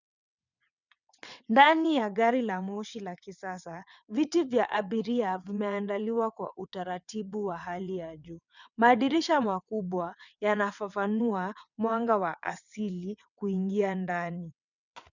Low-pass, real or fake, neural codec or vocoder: 7.2 kHz; fake; vocoder, 22.05 kHz, 80 mel bands, WaveNeXt